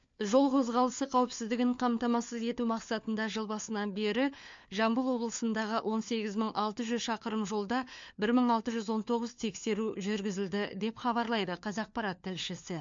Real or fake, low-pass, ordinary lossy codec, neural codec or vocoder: fake; 7.2 kHz; MP3, 48 kbps; codec, 16 kHz, 4 kbps, FunCodec, trained on LibriTTS, 50 frames a second